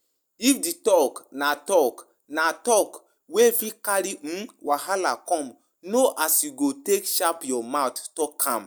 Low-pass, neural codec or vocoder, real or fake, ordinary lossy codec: none; none; real; none